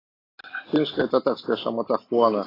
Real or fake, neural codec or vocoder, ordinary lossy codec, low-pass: real; none; AAC, 24 kbps; 5.4 kHz